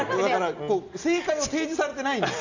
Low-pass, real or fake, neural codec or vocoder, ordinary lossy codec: 7.2 kHz; real; none; none